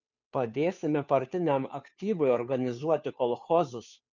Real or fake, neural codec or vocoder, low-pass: fake; codec, 16 kHz, 2 kbps, FunCodec, trained on Chinese and English, 25 frames a second; 7.2 kHz